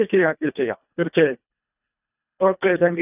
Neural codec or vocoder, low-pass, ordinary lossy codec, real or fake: codec, 24 kHz, 1.5 kbps, HILCodec; 3.6 kHz; none; fake